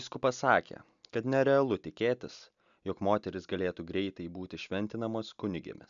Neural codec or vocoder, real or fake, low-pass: none; real; 7.2 kHz